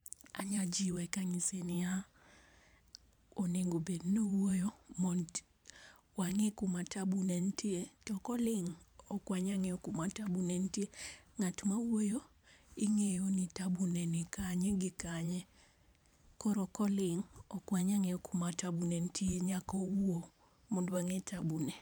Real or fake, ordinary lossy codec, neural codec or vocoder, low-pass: fake; none; vocoder, 44.1 kHz, 128 mel bands every 512 samples, BigVGAN v2; none